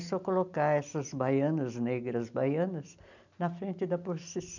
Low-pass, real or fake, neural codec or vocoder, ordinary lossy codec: 7.2 kHz; real; none; none